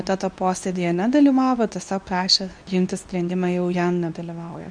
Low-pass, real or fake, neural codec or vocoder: 9.9 kHz; fake; codec, 24 kHz, 0.9 kbps, WavTokenizer, medium speech release version 2